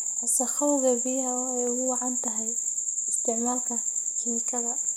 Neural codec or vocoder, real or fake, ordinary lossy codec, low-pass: none; real; none; none